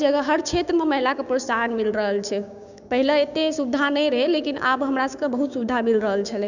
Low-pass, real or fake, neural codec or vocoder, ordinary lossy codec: 7.2 kHz; fake; codec, 16 kHz, 6 kbps, DAC; none